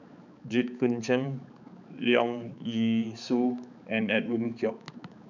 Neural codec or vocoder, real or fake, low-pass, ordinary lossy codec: codec, 16 kHz, 4 kbps, X-Codec, HuBERT features, trained on balanced general audio; fake; 7.2 kHz; none